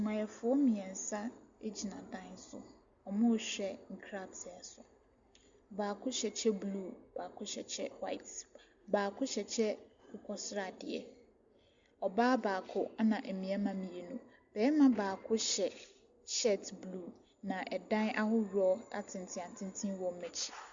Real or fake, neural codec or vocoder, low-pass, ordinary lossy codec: real; none; 7.2 kHz; Opus, 64 kbps